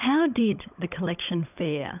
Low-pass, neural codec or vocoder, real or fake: 3.6 kHz; codec, 16 kHz, 16 kbps, FunCodec, trained on LibriTTS, 50 frames a second; fake